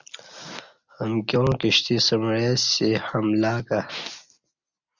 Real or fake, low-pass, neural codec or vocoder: real; 7.2 kHz; none